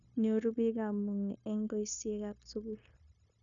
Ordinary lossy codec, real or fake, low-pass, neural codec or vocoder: none; fake; 7.2 kHz; codec, 16 kHz, 0.9 kbps, LongCat-Audio-Codec